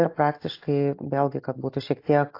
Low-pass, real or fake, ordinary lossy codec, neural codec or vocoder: 5.4 kHz; real; AAC, 32 kbps; none